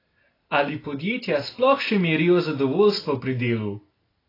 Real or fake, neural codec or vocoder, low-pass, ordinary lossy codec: real; none; 5.4 kHz; AAC, 24 kbps